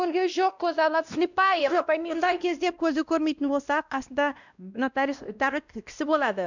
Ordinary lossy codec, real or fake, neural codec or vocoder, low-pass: none; fake; codec, 16 kHz, 1 kbps, X-Codec, WavLM features, trained on Multilingual LibriSpeech; 7.2 kHz